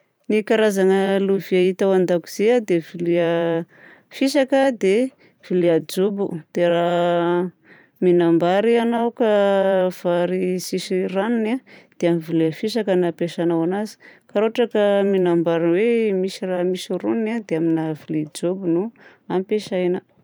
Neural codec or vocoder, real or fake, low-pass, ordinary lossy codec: vocoder, 44.1 kHz, 128 mel bands every 512 samples, BigVGAN v2; fake; none; none